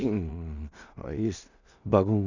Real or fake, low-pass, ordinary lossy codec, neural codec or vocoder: fake; 7.2 kHz; none; codec, 16 kHz in and 24 kHz out, 0.4 kbps, LongCat-Audio-Codec, two codebook decoder